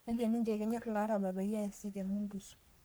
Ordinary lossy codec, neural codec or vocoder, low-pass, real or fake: none; codec, 44.1 kHz, 3.4 kbps, Pupu-Codec; none; fake